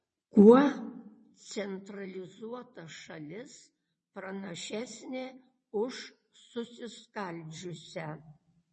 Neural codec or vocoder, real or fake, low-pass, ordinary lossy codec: vocoder, 22.05 kHz, 80 mel bands, WaveNeXt; fake; 9.9 kHz; MP3, 32 kbps